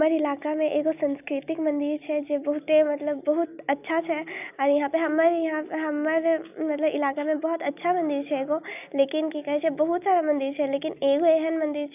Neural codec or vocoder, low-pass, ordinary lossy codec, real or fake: none; 3.6 kHz; none; real